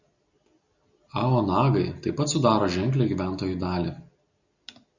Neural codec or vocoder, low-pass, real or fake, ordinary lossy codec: none; 7.2 kHz; real; Opus, 64 kbps